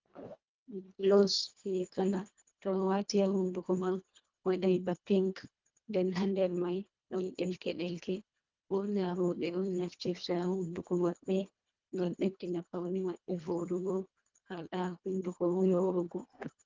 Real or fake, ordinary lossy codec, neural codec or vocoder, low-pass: fake; Opus, 32 kbps; codec, 24 kHz, 1.5 kbps, HILCodec; 7.2 kHz